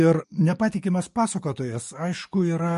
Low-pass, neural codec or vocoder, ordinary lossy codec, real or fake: 14.4 kHz; codec, 44.1 kHz, 7.8 kbps, DAC; MP3, 48 kbps; fake